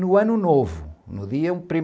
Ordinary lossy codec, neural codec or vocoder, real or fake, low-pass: none; none; real; none